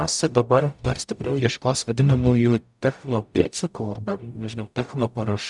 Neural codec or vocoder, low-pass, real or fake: codec, 44.1 kHz, 0.9 kbps, DAC; 10.8 kHz; fake